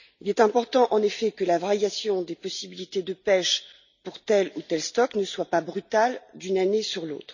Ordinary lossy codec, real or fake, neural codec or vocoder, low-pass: none; real; none; 7.2 kHz